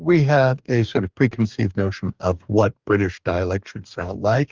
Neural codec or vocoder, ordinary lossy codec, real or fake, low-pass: codec, 44.1 kHz, 2.6 kbps, DAC; Opus, 24 kbps; fake; 7.2 kHz